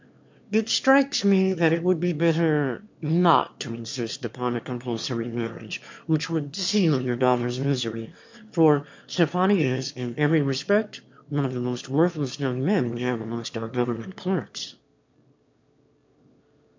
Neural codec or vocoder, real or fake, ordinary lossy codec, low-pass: autoencoder, 22.05 kHz, a latent of 192 numbers a frame, VITS, trained on one speaker; fake; MP3, 48 kbps; 7.2 kHz